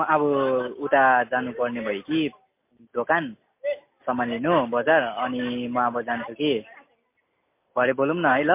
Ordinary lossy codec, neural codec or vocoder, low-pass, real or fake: MP3, 24 kbps; none; 3.6 kHz; real